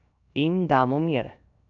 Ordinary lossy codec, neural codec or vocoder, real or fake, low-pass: none; codec, 16 kHz, 0.7 kbps, FocalCodec; fake; 7.2 kHz